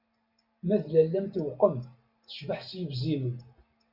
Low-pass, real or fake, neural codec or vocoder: 5.4 kHz; real; none